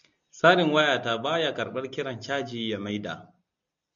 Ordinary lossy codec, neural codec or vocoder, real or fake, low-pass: MP3, 64 kbps; none; real; 7.2 kHz